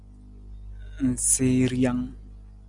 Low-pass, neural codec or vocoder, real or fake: 10.8 kHz; none; real